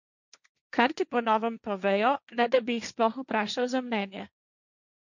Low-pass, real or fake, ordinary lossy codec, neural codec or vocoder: 7.2 kHz; fake; none; codec, 16 kHz, 1.1 kbps, Voila-Tokenizer